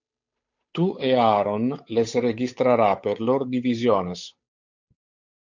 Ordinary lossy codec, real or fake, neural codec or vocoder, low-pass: MP3, 48 kbps; fake; codec, 16 kHz, 8 kbps, FunCodec, trained on Chinese and English, 25 frames a second; 7.2 kHz